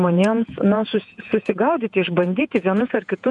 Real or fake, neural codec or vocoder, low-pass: fake; vocoder, 48 kHz, 128 mel bands, Vocos; 10.8 kHz